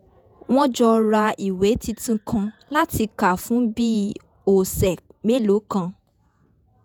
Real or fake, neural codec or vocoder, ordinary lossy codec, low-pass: fake; vocoder, 48 kHz, 128 mel bands, Vocos; none; none